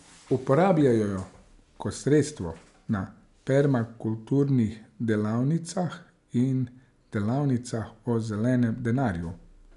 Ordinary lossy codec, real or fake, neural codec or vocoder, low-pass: none; real; none; 10.8 kHz